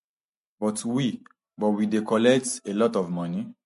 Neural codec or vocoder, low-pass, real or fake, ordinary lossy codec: none; 14.4 kHz; real; MP3, 48 kbps